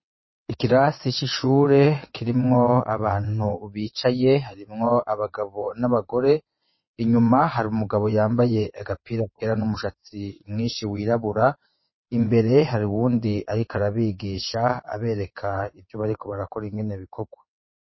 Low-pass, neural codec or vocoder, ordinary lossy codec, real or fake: 7.2 kHz; vocoder, 22.05 kHz, 80 mel bands, WaveNeXt; MP3, 24 kbps; fake